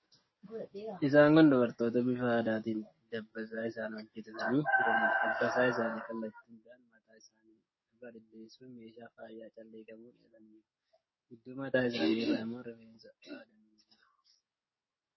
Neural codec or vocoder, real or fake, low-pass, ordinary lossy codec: codec, 44.1 kHz, 7.8 kbps, DAC; fake; 7.2 kHz; MP3, 24 kbps